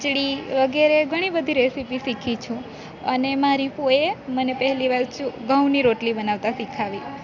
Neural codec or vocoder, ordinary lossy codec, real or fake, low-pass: none; none; real; 7.2 kHz